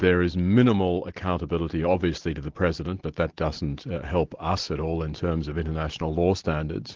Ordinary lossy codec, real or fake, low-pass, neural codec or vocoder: Opus, 16 kbps; real; 7.2 kHz; none